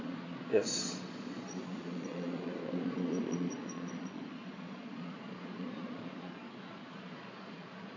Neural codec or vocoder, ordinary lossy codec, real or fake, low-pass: vocoder, 22.05 kHz, 80 mel bands, Vocos; AAC, 32 kbps; fake; 7.2 kHz